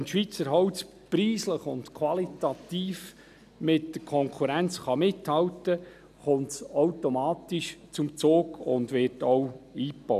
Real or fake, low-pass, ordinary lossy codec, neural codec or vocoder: real; 14.4 kHz; none; none